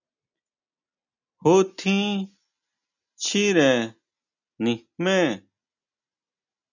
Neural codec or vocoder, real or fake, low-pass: none; real; 7.2 kHz